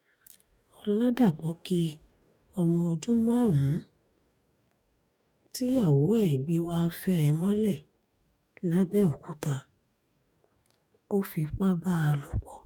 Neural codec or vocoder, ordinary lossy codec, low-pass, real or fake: codec, 44.1 kHz, 2.6 kbps, DAC; none; 19.8 kHz; fake